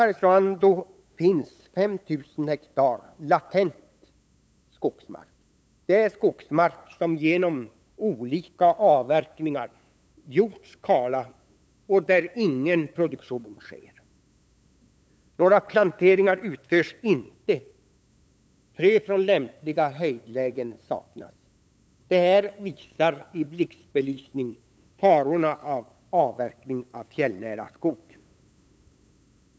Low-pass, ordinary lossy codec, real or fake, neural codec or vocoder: none; none; fake; codec, 16 kHz, 8 kbps, FunCodec, trained on LibriTTS, 25 frames a second